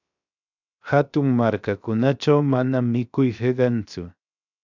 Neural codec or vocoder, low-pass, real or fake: codec, 16 kHz, 0.7 kbps, FocalCodec; 7.2 kHz; fake